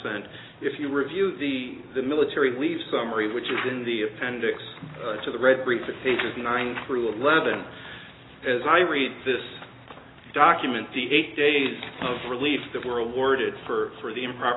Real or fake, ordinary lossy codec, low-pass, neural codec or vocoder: real; AAC, 16 kbps; 7.2 kHz; none